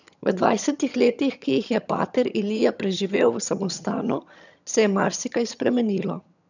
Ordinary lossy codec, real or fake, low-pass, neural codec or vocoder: none; fake; 7.2 kHz; vocoder, 22.05 kHz, 80 mel bands, HiFi-GAN